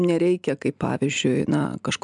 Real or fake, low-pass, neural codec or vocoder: real; 10.8 kHz; none